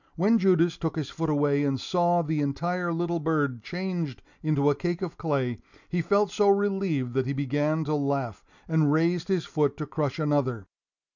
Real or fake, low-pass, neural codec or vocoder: real; 7.2 kHz; none